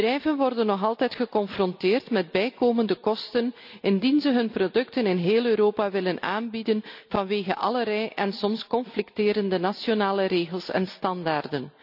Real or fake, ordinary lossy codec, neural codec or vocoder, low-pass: real; none; none; 5.4 kHz